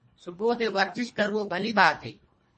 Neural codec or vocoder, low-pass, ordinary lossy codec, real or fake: codec, 24 kHz, 1.5 kbps, HILCodec; 10.8 kHz; MP3, 32 kbps; fake